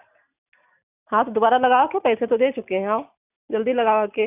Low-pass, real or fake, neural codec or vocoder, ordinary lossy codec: 3.6 kHz; real; none; none